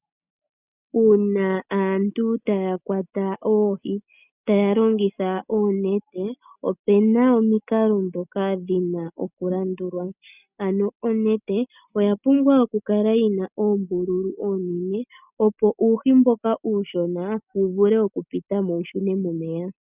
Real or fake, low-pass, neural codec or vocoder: real; 3.6 kHz; none